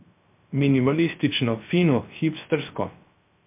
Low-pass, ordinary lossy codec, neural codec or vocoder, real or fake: 3.6 kHz; MP3, 32 kbps; codec, 16 kHz, 0.3 kbps, FocalCodec; fake